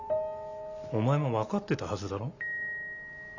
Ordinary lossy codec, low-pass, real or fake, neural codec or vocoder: none; 7.2 kHz; real; none